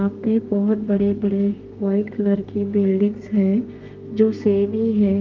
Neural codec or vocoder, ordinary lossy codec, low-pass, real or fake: codec, 32 kHz, 1.9 kbps, SNAC; Opus, 32 kbps; 7.2 kHz; fake